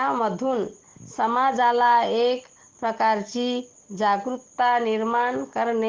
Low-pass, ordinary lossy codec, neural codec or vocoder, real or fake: 7.2 kHz; Opus, 16 kbps; none; real